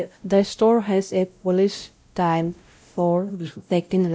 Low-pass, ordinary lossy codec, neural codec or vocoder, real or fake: none; none; codec, 16 kHz, 0.5 kbps, X-Codec, WavLM features, trained on Multilingual LibriSpeech; fake